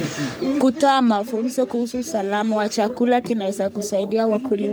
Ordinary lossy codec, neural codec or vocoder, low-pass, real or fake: none; codec, 44.1 kHz, 3.4 kbps, Pupu-Codec; none; fake